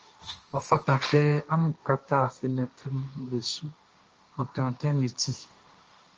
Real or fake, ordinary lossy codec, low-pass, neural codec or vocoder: fake; Opus, 24 kbps; 7.2 kHz; codec, 16 kHz, 1.1 kbps, Voila-Tokenizer